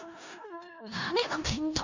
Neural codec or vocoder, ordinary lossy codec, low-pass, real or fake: codec, 16 kHz in and 24 kHz out, 0.4 kbps, LongCat-Audio-Codec, four codebook decoder; Opus, 64 kbps; 7.2 kHz; fake